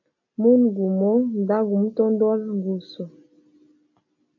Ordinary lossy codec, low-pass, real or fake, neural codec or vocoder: MP3, 32 kbps; 7.2 kHz; real; none